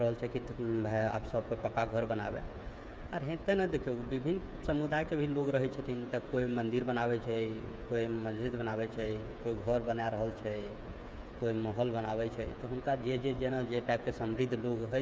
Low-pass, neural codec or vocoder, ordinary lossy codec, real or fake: none; codec, 16 kHz, 8 kbps, FreqCodec, smaller model; none; fake